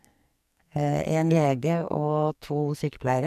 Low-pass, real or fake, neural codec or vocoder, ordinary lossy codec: 14.4 kHz; fake; codec, 44.1 kHz, 2.6 kbps, SNAC; none